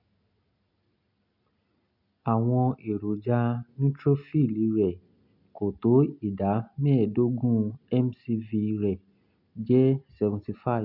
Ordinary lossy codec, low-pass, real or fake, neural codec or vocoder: none; 5.4 kHz; real; none